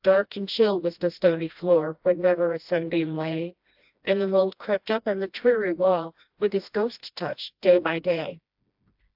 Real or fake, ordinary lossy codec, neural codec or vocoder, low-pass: fake; AAC, 48 kbps; codec, 16 kHz, 1 kbps, FreqCodec, smaller model; 5.4 kHz